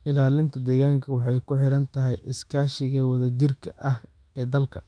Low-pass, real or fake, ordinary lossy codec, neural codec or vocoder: 9.9 kHz; fake; none; autoencoder, 48 kHz, 32 numbers a frame, DAC-VAE, trained on Japanese speech